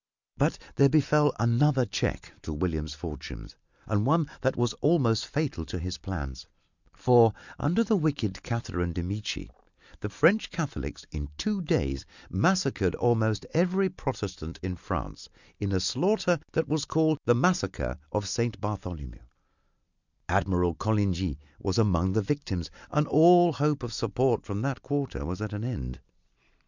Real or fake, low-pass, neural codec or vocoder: real; 7.2 kHz; none